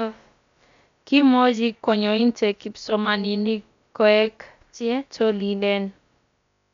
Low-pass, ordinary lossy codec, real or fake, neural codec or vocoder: 7.2 kHz; MP3, 64 kbps; fake; codec, 16 kHz, about 1 kbps, DyCAST, with the encoder's durations